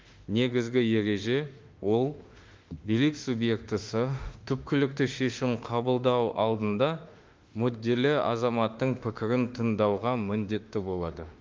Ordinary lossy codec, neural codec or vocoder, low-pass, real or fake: Opus, 32 kbps; autoencoder, 48 kHz, 32 numbers a frame, DAC-VAE, trained on Japanese speech; 7.2 kHz; fake